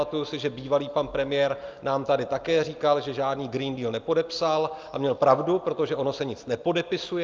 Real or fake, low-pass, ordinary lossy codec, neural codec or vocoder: real; 7.2 kHz; Opus, 24 kbps; none